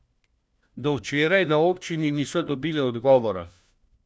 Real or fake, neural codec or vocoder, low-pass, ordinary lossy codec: fake; codec, 16 kHz, 1 kbps, FunCodec, trained on LibriTTS, 50 frames a second; none; none